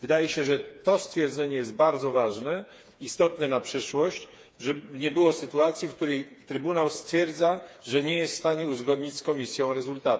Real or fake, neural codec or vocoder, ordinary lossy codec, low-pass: fake; codec, 16 kHz, 4 kbps, FreqCodec, smaller model; none; none